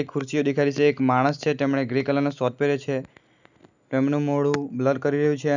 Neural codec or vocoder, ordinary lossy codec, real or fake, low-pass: none; none; real; 7.2 kHz